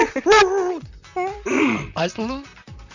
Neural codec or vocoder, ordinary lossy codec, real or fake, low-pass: vocoder, 44.1 kHz, 128 mel bands, Pupu-Vocoder; none; fake; 7.2 kHz